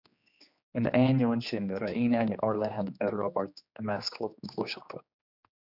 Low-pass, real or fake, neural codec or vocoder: 5.4 kHz; fake; codec, 16 kHz, 2 kbps, X-Codec, HuBERT features, trained on general audio